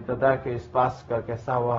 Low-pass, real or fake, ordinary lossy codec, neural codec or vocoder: 7.2 kHz; fake; AAC, 24 kbps; codec, 16 kHz, 0.4 kbps, LongCat-Audio-Codec